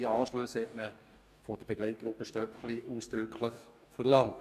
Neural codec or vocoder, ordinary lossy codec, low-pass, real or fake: codec, 44.1 kHz, 2.6 kbps, DAC; none; 14.4 kHz; fake